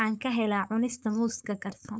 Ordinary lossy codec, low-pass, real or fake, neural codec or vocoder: none; none; fake; codec, 16 kHz, 4.8 kbps, FACodec